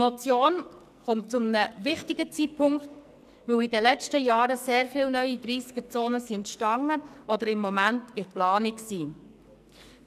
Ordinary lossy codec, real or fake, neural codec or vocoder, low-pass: none; fake; codec, 44.1 kHz, 2.6 kbps, SNAC; 14.4 kHz